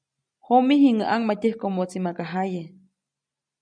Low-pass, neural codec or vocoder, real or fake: 9.9 kHz; none; real